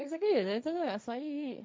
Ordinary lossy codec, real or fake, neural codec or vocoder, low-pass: none; fake; codec, 16 kHz, 1.1 kbps, Voila-Tokenizer; none